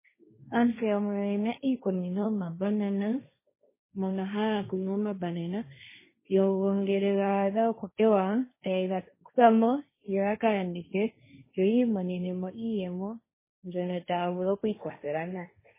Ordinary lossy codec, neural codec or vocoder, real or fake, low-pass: MP3, 16 kbps; codec, 16 kHz, 1.1 kbps, Voila-Tokenizer; fake; 3.6 kHz